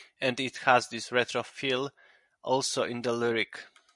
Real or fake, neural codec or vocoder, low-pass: real; none; 10.8 kHz